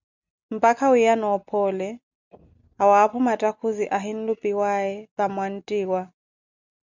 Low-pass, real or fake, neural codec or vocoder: 7.2 kHz; real; none